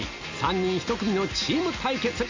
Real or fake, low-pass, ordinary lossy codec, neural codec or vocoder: real; 7.2 kHz; MP3, 64 kbps; none